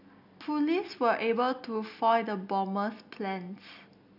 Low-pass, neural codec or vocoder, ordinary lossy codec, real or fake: 5.4 kHz; none; none; real